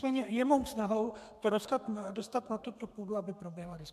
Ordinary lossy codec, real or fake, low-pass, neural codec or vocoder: MP3, 96 kbps; fake; 14.4 kHz; codec, 32 kHz, 1.9 kbps, SNAC